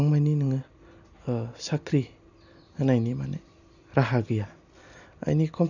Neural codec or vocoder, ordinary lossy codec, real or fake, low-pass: none; none; real; 7.2 kHz